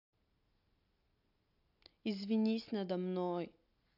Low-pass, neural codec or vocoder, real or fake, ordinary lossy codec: 5.4 kHz; none; real; none